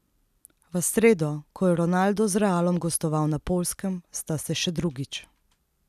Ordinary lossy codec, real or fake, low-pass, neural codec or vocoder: none; real; 14.4 kHz; none